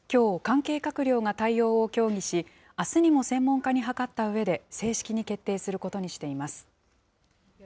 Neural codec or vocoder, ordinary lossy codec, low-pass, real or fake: none; none; none; real